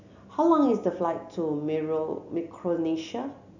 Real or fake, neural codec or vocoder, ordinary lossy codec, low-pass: real; none; none; 7.2 kHz